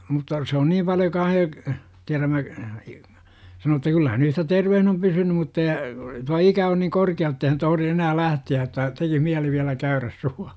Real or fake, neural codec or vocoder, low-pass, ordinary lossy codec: real; none; none; none